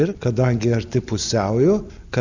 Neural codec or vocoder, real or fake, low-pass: none; real; 7.2 kHz